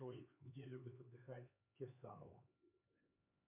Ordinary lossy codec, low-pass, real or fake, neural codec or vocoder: MP3, 24 kbps; 3.6 kHz; fake; codec, 16 kHz, 4 kbps, X-Codec, HuBERT features, trained on LibriSpeech